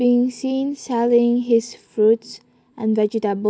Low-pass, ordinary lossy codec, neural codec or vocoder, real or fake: none; none; none; real